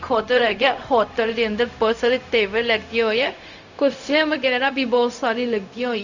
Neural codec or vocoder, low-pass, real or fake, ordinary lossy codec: codec, 16 kHz, 0.4 kbps, LongCat-Audio-Codec; 7.2 kHz; fake; none